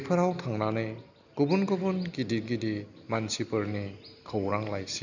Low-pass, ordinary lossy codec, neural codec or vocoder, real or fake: 7.2 kHz; none; none; real